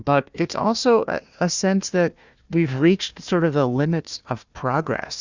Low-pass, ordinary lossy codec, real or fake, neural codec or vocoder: 7.2 kHz; Opus, 64 kbps; fake; codec, 16 kHz, 1 kbps, FunCodec, trained on Chinese and English, 50 frames a second